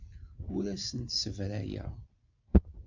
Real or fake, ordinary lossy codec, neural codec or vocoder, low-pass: real; AAC, 48 kbps; none; 7.2 kHz